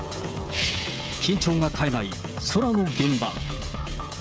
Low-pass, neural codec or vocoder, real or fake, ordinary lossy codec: none; codec, 16 kHz, 16 kbps, FreqCodec, smaller model; fake; none